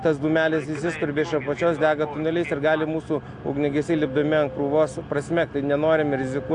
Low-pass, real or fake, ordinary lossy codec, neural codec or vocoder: 9.9 kHz; real; AAC, 64 kbps; none